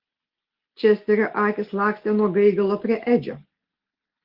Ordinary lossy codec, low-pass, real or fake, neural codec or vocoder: Opus, 16 kbps; 5.4 kHz; real; none